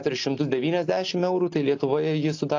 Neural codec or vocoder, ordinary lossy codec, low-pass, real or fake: none; AAC, 48 kbps; 7.2 kHz; real